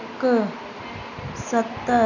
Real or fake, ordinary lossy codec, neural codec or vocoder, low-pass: real; none; none; 7.2 kHz